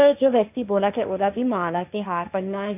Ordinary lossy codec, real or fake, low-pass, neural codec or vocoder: none; fake; 3.6 kHz; codec, 16 kHz, 1.1 kbps, Voila-Tokenizer